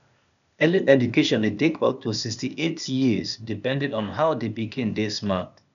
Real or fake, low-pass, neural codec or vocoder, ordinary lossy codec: fake; 7.2 kHz; codec, 16 kHz, 0.8 kbps, ZipCodec; none